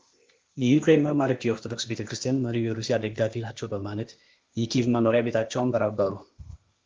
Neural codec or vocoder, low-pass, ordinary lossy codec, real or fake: codec, 16 kHz, 0.8 kbps, ZipCodec; 7.2 kHz; Opus, 32 kbps; fake